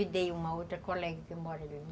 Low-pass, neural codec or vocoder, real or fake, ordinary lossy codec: none; none; real; none